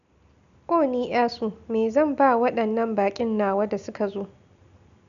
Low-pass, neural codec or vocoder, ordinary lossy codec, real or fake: 7.2 kHz; none; none; real